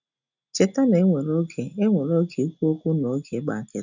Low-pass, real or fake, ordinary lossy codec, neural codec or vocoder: 7.2 kHz; real; none; none